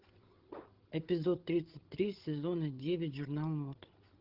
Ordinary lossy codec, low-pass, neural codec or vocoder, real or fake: Opus, 16 kbps; 5.4 kHz; codec, 16 kHz, 16 kbps, FreqCodec, larger model; fake